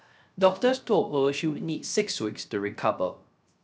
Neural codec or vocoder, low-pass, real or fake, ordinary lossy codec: codec, 16 kHz, 0.3 kbps, FocalCodec; none; fake; none